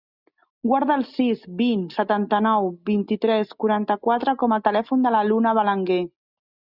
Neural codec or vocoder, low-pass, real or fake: none; 5.4 kHz; real